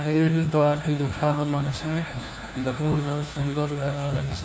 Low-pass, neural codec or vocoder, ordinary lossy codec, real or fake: none; codec, 16 kHz, 1 kbps, FunCodec, trained on LibriTTS, 50 frames a second; none; fake